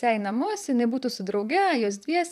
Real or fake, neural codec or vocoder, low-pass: fake; vocoder, 44.1 kHz, 128 mel bands, Pupu-Vocoder; 14.4 kHz